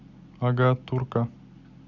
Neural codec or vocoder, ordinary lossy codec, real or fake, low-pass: none; none; real; 7.2 kHz